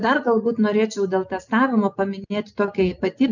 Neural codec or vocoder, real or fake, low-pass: none; real; 7.2 kHz